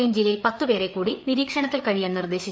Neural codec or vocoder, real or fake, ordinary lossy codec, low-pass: codec, 16 kHz, 4 kbps, FreqCodec, larger model; fake; none; none